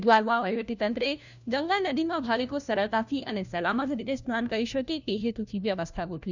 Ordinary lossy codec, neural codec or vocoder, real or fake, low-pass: none; codec, 16 kHz, 1 kbps, FunCodec, trained on LibriTTS, 50 frames a second; fake; 7.2 kHz